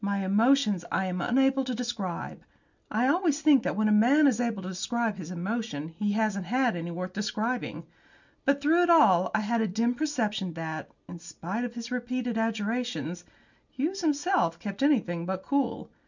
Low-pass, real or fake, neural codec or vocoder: 7.2 kHz; real; none